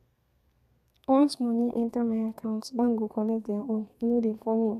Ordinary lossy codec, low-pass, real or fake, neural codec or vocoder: none; 14.4 kHz; fake; codec, 32 kHz, 1.9 kbps, SNAC